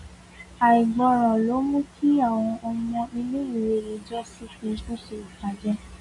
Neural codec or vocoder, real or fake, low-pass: none; real; 10.8 kHz